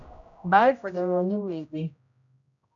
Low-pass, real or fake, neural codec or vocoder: 7.2 kHz; fake; codec, 16 kHz, 0.5 kbps, X-Codec, HuBERT features, trained on general audio